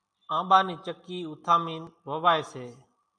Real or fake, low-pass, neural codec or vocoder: real; 9.9 kHz; none